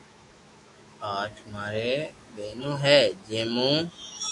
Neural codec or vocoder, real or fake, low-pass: autoencoder, 48 kHz, 128 numbers a frame, DAC-VAE, trained on Japanese speech; fake; 10.8 kHz